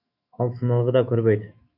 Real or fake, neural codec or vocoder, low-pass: fake; autoencoder, 48 kHz, 128 numbers a frame, DAC-VAE, trained on Japanese speech; 5.4 kHz